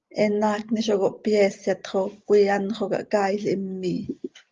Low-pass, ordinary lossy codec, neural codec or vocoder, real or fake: 7.2 kHz; Opus, 32 kbps; none; real